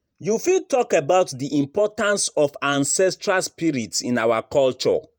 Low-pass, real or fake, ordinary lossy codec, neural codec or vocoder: none; fake; none; vocoder, 48 kHz, 128 mel bands, Vocos